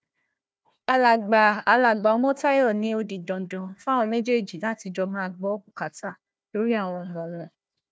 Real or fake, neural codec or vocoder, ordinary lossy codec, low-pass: fake; codec, 16 kHz, 1 kbps, FunCodec, trained on Chinese and English, 50 frames a second; none; none